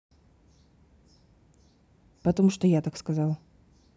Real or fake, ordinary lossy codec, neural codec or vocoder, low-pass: real; none; none; none